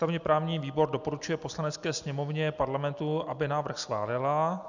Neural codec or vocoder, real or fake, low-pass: none; real; 7.2 kHz